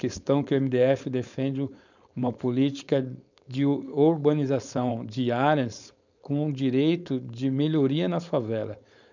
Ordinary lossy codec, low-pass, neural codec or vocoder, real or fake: none; 7.2 kHz; codec, 16 kHz, 4.8 kbps, FACodec; fake